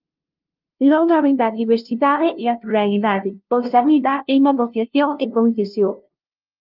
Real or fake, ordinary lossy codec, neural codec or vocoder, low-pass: fake; Opus, 32 kbps; codec, 16 kHz, 0.5 kbps, FunCodec, trained on LibriTTS, 25 frames a second; 5.4 kHz